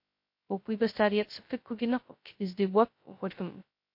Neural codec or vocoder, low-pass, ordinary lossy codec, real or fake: codec, 16 kHz, 0.2 kbps, FocalCodec; 5.4 kHz; MP3, 32 kbps; fake